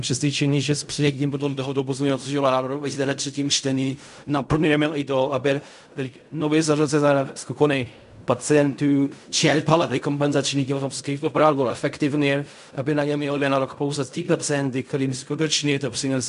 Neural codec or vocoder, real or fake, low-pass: codec, 16 kHz in and 24 kHz out, 0.4 kbps, LongCat-Audio-Codec, fine tuned four codebook decoder; fake; 10.8 kHz